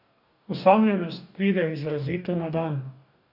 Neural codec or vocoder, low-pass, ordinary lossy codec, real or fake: codec, 44.1 kHz, 2.6 kbps, DAC; 5.4 kHz; none; fake